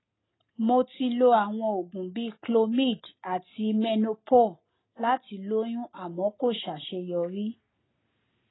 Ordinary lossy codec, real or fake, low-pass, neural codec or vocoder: AAC, 16 kbps; real; 7.2 kHz; none